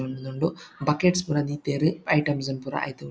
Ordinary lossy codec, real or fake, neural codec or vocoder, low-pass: none; real; none; none